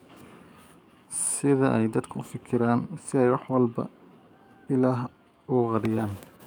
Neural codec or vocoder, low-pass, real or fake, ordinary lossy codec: codec, 44.1 kHz, 7.8 kbps, DAC; none; fake; none